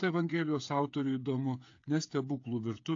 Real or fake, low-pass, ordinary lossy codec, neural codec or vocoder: fake; 7.2 kHz; AAC, 48 kbps; codec, 16 kHz, 8 kbps, FreqCodec, smaller model